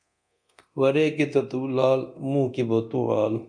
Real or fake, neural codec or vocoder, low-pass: fake; codec, 24 kHz, 0.9 kbps, DualCodec; 9.9 kHz